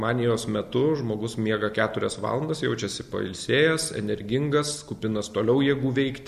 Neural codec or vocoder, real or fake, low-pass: none; real; 14.4 kHz